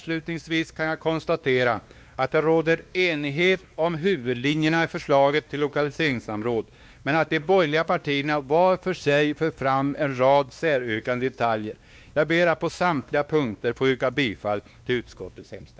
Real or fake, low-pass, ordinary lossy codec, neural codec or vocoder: fake; none; none; codec, 16 kHz, 2 kbps, X-Codec, WavLM features, trained on Multilingual LibriSpeech